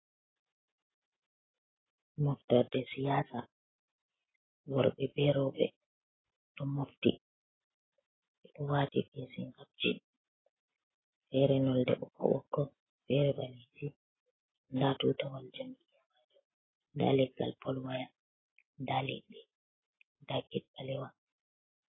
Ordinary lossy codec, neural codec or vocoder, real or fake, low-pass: AAC, 16 kbps; none; real; 7.2 kHz